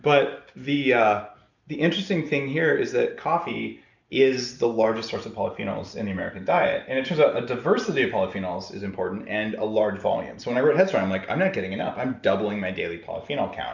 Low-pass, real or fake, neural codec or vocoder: 7.2 kHz; real; none